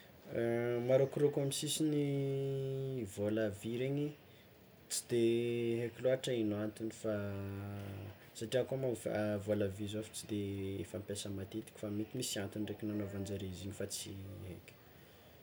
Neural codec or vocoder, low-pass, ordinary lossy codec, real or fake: none; none; none; real